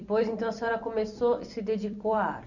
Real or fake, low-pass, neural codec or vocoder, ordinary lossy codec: fake; 7.2 kHz; vocoder, 44.1 kHz, 128 mel bands every 256 samples, BigVGAN v2; none